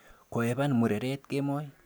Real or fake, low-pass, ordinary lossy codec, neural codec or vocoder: real; none; none; none